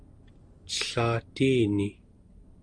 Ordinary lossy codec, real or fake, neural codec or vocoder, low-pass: Opus, 32 kbps; real; none; 9.9 kHz